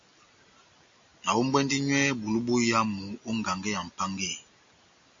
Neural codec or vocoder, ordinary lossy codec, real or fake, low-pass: none; MP3, 48 kbps; real; 7.2 kHz